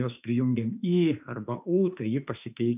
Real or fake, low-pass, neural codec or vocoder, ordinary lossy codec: fake; 3.6 kHz; autoencoder, 48 kHz, 32 numbers a frame, DAC-VAE, trained on Japanese speech; AAC, 32 kbps